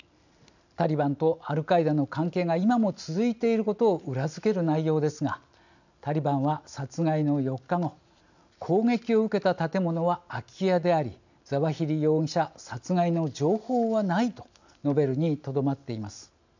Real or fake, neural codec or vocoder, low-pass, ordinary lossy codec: real; none; 7.2 kHz; none